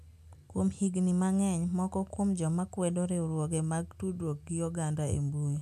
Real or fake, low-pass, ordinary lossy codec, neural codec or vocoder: real; 14.4 kHz; none; none